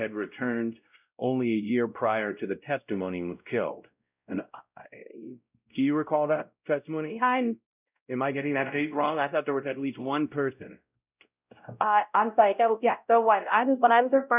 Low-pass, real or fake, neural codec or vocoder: 3.6 kHz; fake; codec, 16 kHz, 0.5 kbps, X-Codec, WavLM features, trained on Multilingual LibriSpeech